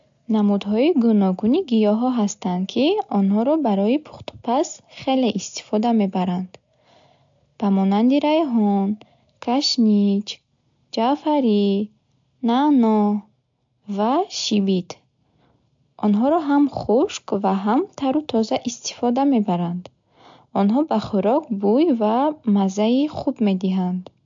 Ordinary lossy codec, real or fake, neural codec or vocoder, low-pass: AAC, 64 kbps; real; none; 7.2 kHz